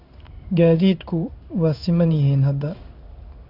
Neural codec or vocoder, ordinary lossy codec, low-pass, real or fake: codec, 16 kHz in and 24 kHz out, 1 kbps, XY-Tokenizer; none; 5.4 kHz; fake